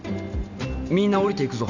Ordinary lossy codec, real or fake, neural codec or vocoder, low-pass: none; real; none; 7.2 kHz